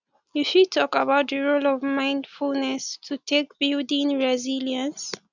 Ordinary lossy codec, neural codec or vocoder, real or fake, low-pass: none; none; real; 7.2 kHz